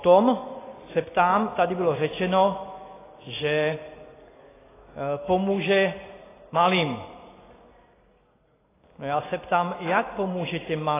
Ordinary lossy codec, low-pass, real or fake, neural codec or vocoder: AAC, 16 kbps; 3.6 kHz; real; none